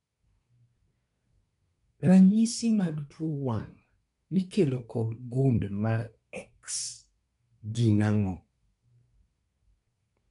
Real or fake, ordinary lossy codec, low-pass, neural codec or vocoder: fake; none; 10.8 kHz; codec, 24 kHz, 1 kbps, SNAC